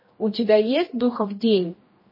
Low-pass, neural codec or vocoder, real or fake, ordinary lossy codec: 5.4 kHz; codec, 16 kHz, 1 kbps, X-Codec, HuBERT features, trained on general audio; fake; MP3, 24 kbps